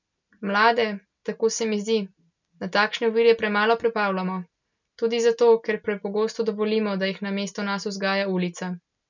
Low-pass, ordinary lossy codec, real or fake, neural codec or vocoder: 7.2 kHz; none; real; none